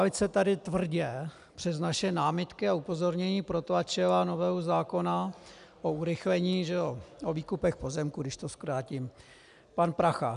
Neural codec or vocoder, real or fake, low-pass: none; real; 10.8 kHz